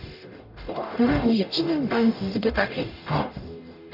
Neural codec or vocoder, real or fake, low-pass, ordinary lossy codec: codec, 44.1 kHz, 0.9 kbps, DAC; fake; 5.4 kHz; none